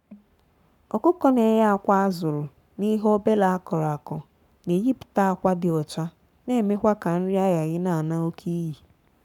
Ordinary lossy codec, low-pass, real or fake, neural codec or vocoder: none; 19.8 kHz; fake; codec, 44.1 kHz, 7.8 kbps, Pupu-Codec